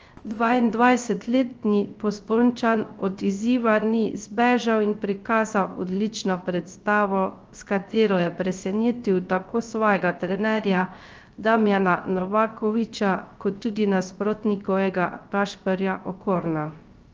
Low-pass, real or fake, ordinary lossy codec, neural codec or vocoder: 7.2 kHz; fake; Opus, 24 kbps; codec, 16 kHz, 0.3 kbps, FocalCodec